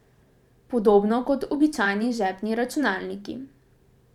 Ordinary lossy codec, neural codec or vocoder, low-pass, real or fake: none; vocoder, 44.1 kHz, 128 mel bands every 512 samples, BigVGAN v2; 19.8 kHz; fake